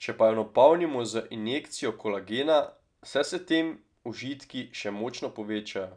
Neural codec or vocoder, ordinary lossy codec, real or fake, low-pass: none; none; real; 9.9 kHz